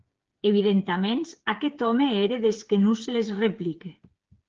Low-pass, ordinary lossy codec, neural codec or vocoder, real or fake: 7.2 kHz; Opus, 16 kbps; codec, 16 kHz, 8 kbps, FreqCodec, smaller model; fake